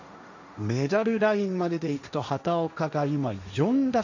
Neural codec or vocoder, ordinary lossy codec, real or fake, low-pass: codec, 16 kHz, 1.1 kbps, Voila-Tokenizer; none; fake; 7.2 kHz